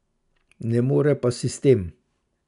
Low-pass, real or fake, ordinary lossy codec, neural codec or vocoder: 10.8 kHz; real; none; none